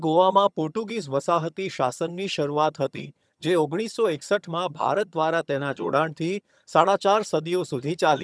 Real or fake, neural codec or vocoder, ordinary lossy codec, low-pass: fake; vocoder, 22.05 kHz, 80 mel bands, HiFi-GAN; none; none